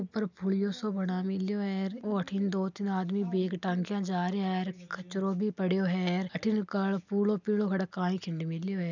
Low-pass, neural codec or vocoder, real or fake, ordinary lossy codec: 7.2 kHz; none; real; none